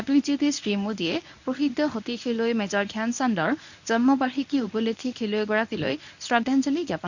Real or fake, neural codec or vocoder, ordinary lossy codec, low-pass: fake; codec, 24 kHz, 0.9 kbps, WavTokenizer, medium speech release version 1; none; 7.2 kHz